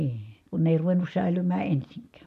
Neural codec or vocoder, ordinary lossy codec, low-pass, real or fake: none; none; 14.4 kHz; real